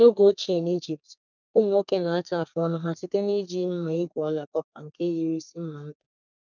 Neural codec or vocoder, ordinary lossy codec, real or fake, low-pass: codec, 32 kHz, 1.9 kbps, SNAC; none; fake; 7.2 kHz